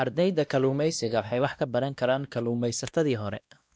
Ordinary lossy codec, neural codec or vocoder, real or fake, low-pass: none; codec, 16 kHz, 1 kbps, X-Codec, WavLM features, trained on Multilingual LibriSpeech; fake; none